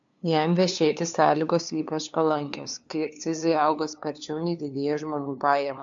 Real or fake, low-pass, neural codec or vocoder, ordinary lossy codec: fake; 7.2 kHz; codec, 16 kHz, 2 kbps, FunCodec, trained on LibriTTS, 25 frames a second; MP3, 64 kbps